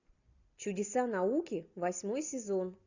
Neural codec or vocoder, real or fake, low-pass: none; real; 7.2 kHz